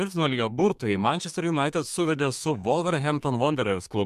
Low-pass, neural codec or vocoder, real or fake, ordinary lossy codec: 14.4 kHz; codec, 32 kHz, 1.9 kbps, SNAC; fake; MP3, 96 kbps